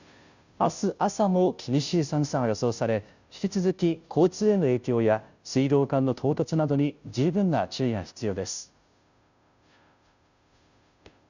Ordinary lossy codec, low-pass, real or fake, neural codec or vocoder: none; 7.2 kHz; fake; codec, 16 kHz, 0.5 kbps, FunCodec, trained on Chinese and English, 25 frames a second